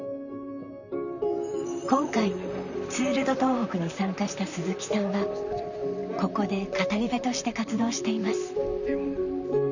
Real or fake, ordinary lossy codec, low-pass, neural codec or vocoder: fake; none; 7.2 kHz; vocoder, 44.1 kHz, 128 mel bands, Pupu-Vocoder